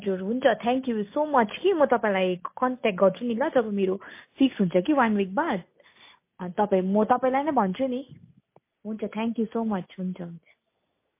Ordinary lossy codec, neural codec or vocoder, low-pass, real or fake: MP3, 24 kbps; none; 3.6 kHz; real